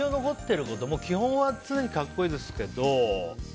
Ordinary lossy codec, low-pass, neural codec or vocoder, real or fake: none; none; none; real